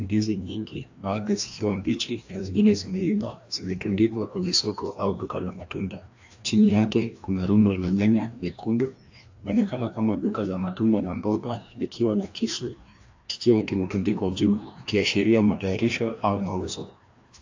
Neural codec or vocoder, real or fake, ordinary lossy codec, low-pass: codec, 16 kHz, 1 kbps, FreqCodec, larger model; fake; AAC, 48 kbps; 7.2 kHz